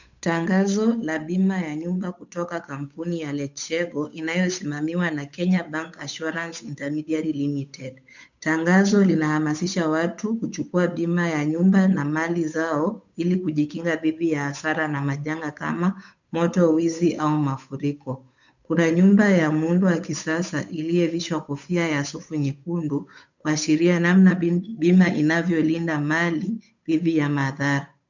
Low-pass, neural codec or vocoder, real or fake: 7.2 kHz; codec, 16 kHz, 8 kbps, FunCodec, trained on Chinese and English, 25 frames a second; fake